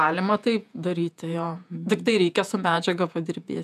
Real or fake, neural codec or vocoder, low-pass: fake; vocoder, 48 kHz, 128 mel bands, Vocos; 14.4 kHz